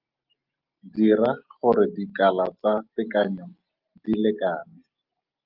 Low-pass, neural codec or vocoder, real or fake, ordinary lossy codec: 5.4 kHz; none; real; Opus, 24 kbps